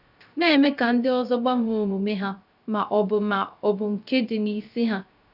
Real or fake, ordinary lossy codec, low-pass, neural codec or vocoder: fake; none; 5.4 kHz; codec, 16 kHz, 0.3 kbps, FocalCodec